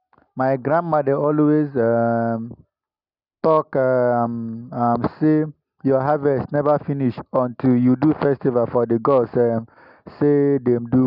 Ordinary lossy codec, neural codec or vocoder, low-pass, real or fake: none; none; 5.4 kHz; real